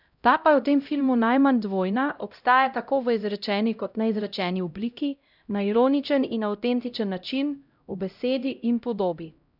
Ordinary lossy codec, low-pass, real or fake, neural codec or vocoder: none; 5.4 kHz; fake; codec, 16 kHz, 0.5 kbps, X-Codec, HuBERT features, trained on LibriSpeech